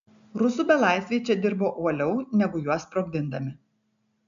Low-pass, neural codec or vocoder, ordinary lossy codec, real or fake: 7.2 kHz; none; AAC, 96 kbps; real